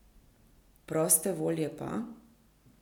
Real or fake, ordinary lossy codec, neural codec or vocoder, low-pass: real; none; none; 19.8 kHz